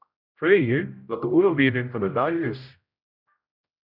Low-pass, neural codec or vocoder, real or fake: 5.4 kHz; codec, 16 kHz, 0.5 kbps, X-Codec, HuBERT features, trained on general audio; fake